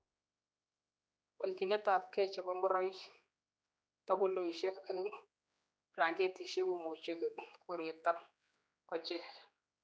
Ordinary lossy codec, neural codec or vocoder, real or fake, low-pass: none; codec, 16 kHz, 2 kbps, X-Codec, HuBERT features, trained on general audio; fake; none